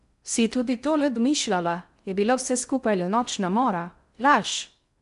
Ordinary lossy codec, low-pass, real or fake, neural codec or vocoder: none; 10.8 kHz; fake; codec, 16 kHz in and 24 kHz out, 0.6 kbps, FocalCodec, streaming, 4096 codes